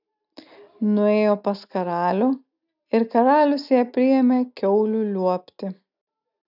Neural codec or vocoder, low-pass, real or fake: none; 5.4 kHz; real